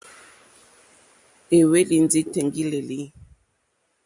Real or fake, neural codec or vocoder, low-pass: real; none; 10.8 kHz